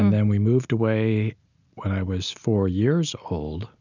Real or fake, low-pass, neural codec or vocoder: real; 7.2 kHz; none